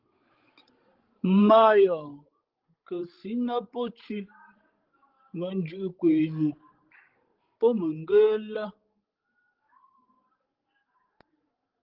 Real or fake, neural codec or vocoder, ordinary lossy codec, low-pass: fake; codec, 16 kHz, 16 kbps, FreqCodec, larger model; Opus, 16 kbps; 5.4 kHz